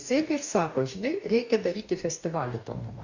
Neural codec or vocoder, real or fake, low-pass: codec, 44.1 kHz, 2.6 kbps, DAC; fake; 7.2 kHz